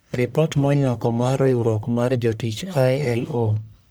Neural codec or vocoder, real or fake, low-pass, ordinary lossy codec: codec, 44.1 kHz, 1.7 kbps, Pupu-Codec; fake; none; none